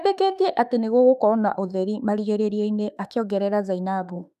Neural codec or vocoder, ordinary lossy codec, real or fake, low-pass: autoencoder, 48 kHz, 32 numbers a frame, DAC-VAE, trained on Japanese speech; none; fake; 14.4 kHz